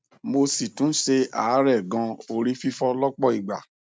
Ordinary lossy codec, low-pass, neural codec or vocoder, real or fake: none; none; none; real